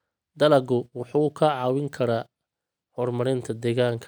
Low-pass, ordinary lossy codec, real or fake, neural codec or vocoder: none; none; real; none